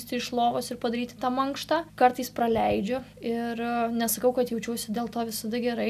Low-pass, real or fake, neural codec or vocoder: 14.4 kHz; real; none